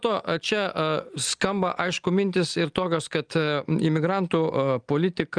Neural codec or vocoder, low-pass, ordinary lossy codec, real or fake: none; 9.9 kHz; Opus, 32 kbps; real